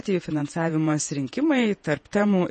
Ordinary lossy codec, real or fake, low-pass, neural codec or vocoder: MP3, 32 kbps; fake; 10.8 kHz; vocoder, 48 kHz, 128 mel bands, Vocos